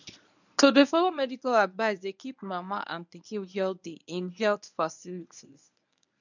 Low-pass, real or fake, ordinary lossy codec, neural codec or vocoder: 7.2 kHz; fake; none; codec, 24 kHz, 0.9 kbps, WavTokenizer, medium speech release version 1